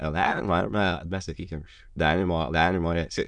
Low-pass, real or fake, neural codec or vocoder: 9.9 kHz; fake; autoencoder, 22.05 kHz, a latent of 192 numbers a frame, VITS, trained on many speakers